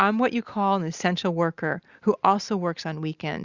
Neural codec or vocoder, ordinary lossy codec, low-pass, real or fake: none; Opus, 64 kbps; 7.2 kHz; real